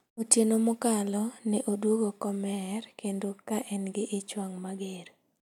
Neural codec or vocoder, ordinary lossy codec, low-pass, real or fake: none; none; 19.8 kHz; real